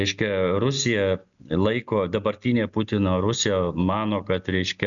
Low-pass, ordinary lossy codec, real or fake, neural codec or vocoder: 7.2 kHz; MP3, 96 kbps; real; none